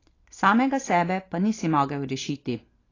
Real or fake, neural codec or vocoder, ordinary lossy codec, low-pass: real; none; AAC, 32 kbps; 7.2 kHz